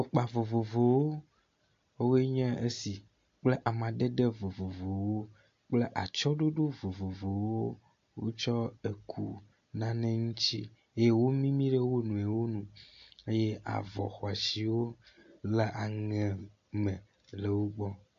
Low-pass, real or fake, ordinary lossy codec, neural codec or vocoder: 7.2 kHz; real; AAC, 48 kbps; none